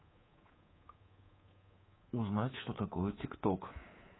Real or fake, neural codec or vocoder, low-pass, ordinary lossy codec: fake; codec, 24 kHz, 3.1 kbps, DualCodec; 7.2 kHz; AAC, 16 kbps